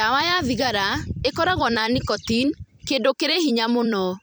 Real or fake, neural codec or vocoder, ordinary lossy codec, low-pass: fake; vocoder, 44.1 kHz, 128 mel bands every 256 samples, BigVGAN v2; none; none